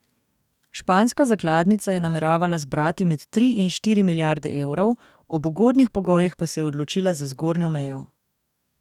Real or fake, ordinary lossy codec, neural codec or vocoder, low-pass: fake; none; codec, 44.1 kHz, 2.6 kbps, DAC; 19.8 kHz